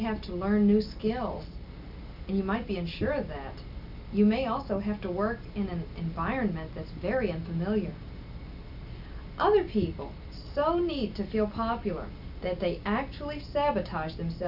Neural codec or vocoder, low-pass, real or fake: none; 5.4 kHz; real